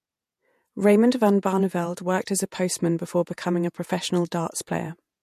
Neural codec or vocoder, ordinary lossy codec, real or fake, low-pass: vocoder, 48 kHz, 128 mel bands, Vocos; MP3, 64 kbps; fake; 14.4 kHz